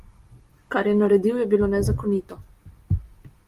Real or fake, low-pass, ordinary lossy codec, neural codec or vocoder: real; 14.4 kHz; Opus, 32 kbps; none